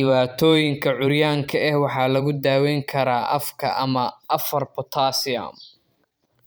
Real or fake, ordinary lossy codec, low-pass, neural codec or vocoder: fake; none; none; vocoder, 44.1 kHz, 128 mel bands every 512 samples, BigVGAN v2